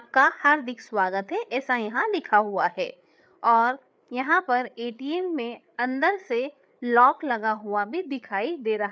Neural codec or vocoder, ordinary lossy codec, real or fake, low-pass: codec, 16 kHz, 8 kbps, FreqCodec, larger model; none; fake; none